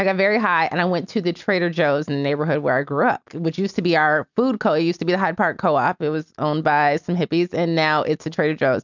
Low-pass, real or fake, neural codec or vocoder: 7.2 kHz; real; none